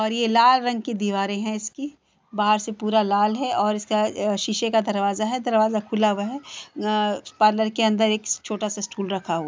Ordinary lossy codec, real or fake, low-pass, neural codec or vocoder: none; real; none; none